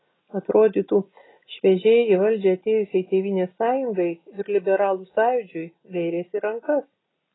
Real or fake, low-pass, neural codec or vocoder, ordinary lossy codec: real; 7.2 kHz; none; AAC, 16 kbps